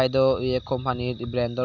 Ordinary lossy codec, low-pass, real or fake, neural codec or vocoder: none; 7.2 kHz; real; none